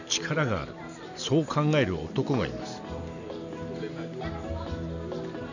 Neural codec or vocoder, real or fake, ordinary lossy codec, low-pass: none; real; none; 7.2 kHz